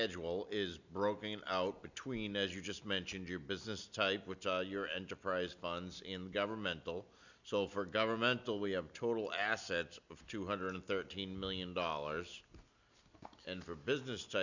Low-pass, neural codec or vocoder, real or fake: 7.2 kHz; none; real